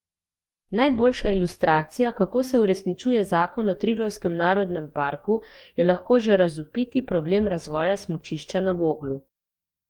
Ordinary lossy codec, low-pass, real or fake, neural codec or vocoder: Opus, 32 kbps; 19.8 kHz; fake; codec, 44.1 kHz, 2.6 kbps, DAC